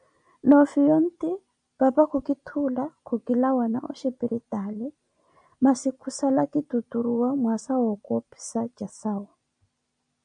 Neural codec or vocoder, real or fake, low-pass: none; real; 9.9 kHz